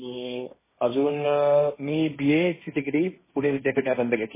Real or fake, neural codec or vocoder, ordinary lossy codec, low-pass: fake; codec, 16 kHz, 1.1 kbps, Voila-Tokenizer; MP3, 16 kbps; 3.6 kHz